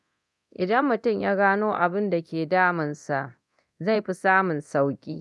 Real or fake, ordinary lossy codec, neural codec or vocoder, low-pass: fake; none; codec, 24 kHz, 0.9 kbps, DualCodec; none